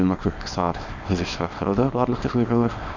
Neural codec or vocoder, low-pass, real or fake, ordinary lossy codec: codec, 24 kHz, 0.9 kbps, WavTokenizer, small release; 7.2 kHz; fake; none